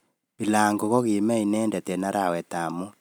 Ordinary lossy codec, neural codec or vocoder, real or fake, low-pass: none; none; real; none